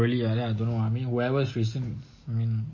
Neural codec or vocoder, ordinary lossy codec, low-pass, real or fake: none; MP3, 32 kbps; 7.2 kHz; real